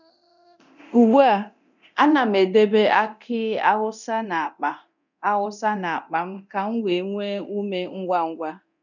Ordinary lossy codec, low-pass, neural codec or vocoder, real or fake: none; 7.2 kHz; codec, 24 kHz, 0.9 kbps, DualCodec; fake